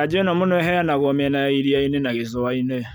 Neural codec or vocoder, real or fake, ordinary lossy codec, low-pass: none; real; none; none